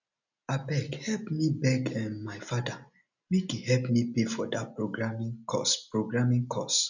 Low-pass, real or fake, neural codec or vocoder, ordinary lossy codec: 7.2 kHz; real; none; none